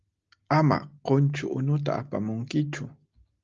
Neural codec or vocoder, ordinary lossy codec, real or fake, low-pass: none; Opus, 32 kbps; real; 7.2 kHz